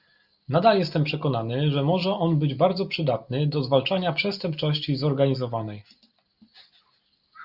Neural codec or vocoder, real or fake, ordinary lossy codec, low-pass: none; real; Opus, 64 kbps; 5.4 kHz